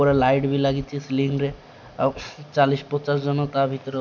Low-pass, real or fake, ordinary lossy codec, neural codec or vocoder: 7.2 kHz; real; none; none